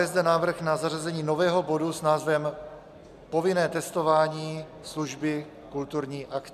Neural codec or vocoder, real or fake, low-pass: none; real; 14.4 kHz